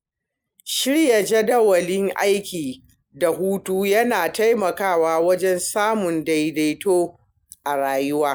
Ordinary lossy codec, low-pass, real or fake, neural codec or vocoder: none; none; real; none